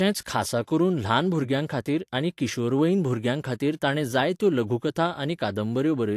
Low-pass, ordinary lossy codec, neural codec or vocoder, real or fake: 14.4 kHz; AAC, 64 kbps; vocoder, 44.1 kHz, 128 mel bands every 512 samples, BigVGAN v2; fake